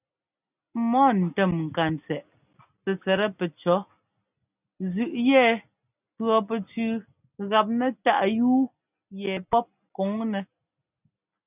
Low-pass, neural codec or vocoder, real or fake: 3.6 kHz; none; real